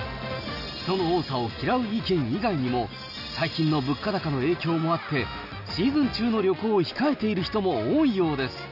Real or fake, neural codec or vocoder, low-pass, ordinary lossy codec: real; none; 5.4 kHz; none